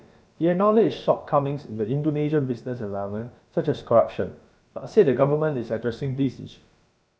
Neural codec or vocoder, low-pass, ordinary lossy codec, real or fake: codec, 16 kHz, about 1 kbps, DyCAST, with the encoder's durations; none; none; fake